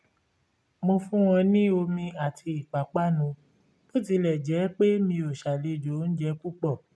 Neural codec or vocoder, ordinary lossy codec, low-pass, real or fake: none; none; none; real